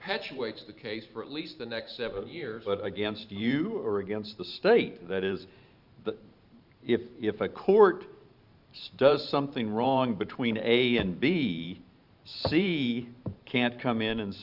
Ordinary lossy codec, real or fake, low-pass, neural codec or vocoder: Opus, 64 kbps; fake; 5.4 kHz; vocoder, 44.1 kHz, 128 mel bands every 512 samples, BigVGAN v2